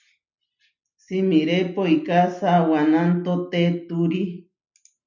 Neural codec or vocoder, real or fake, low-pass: none; real; 7.2 kHz